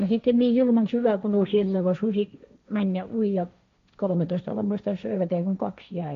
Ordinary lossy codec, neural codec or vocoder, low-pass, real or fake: MP3, 64 kbps; codec, 16 kHz, 1.1 kbps, Voila-Tokenizer; 7.2 kHz; fake